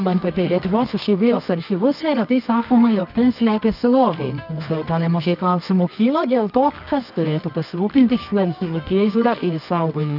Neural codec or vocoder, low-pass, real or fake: codec, 24 kHz, 0.9 kbps, WavTokenizer, medium music audio release; 5.4 kHz; fake